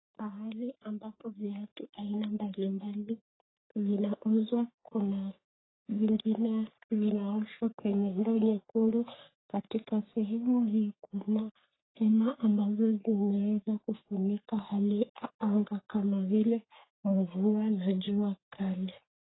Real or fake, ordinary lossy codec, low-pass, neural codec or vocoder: fake; AAC, 16 kbps; 7.2 kHz; codec, 44.1 kHz, 3.4 kbps, Pupu-Codec